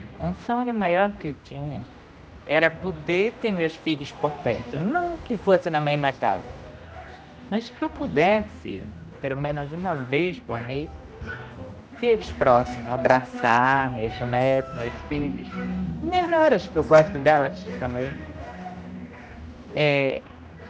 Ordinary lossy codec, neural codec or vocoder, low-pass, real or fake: none; codec, 16 kHz, 1 kbps, X-Codec, HuBERT features, trained on general audio; none; fake